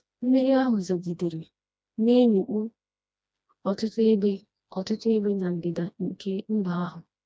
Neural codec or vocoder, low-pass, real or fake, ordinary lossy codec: codec, 16 kHz, 1 kbps, FreqCodec, smaller model; none; fake; none